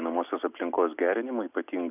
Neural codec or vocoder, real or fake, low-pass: none; real; 3.6 kHz